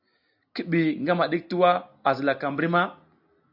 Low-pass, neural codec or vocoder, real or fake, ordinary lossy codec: 5.4 kHz; none; real; AAC, 48 kbps